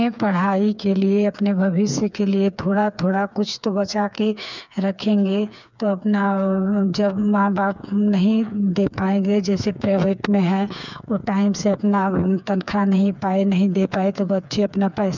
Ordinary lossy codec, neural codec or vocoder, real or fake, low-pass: none; codec, 16 kHz, 4 kbps, FreqCodec, smaller model; fake; 7.2 kHz